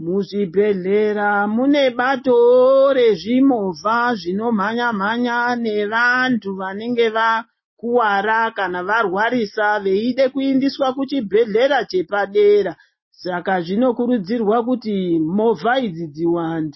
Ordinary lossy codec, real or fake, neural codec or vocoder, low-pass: MP3, 24 kbps; real; none; 7.2 kHz